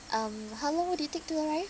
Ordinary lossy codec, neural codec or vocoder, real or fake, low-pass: none; none; real; none